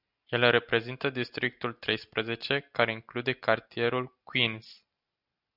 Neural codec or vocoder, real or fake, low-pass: none; real; 5.4 kHz